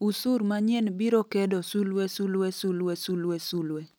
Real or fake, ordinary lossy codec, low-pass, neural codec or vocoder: real; none; none; none